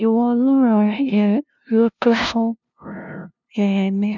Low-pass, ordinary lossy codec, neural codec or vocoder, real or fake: 7.2 kHz; none; codec, 16 kHz, 0.5 kbps, FunCodec, trained on LibriTTS, 25 frames a second; fake